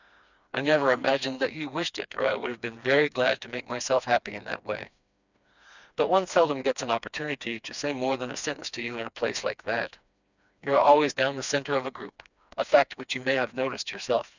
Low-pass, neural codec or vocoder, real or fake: 7.2 kHz; codec, 16 kHz, 2 kbps, FreqCodec, smaller model; fake